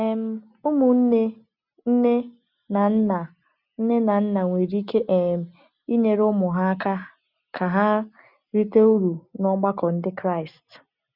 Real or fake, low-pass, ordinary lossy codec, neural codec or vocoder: real; 5.4 kHz; none; none